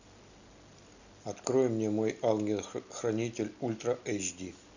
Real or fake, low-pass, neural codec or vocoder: real; 7.2 kHz; none